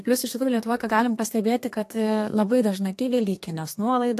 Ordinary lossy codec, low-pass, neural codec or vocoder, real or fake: AAC, 64 kbps; 14.4 kHz; codec, 32 kHz, 1.9 kbps, SNAC; fake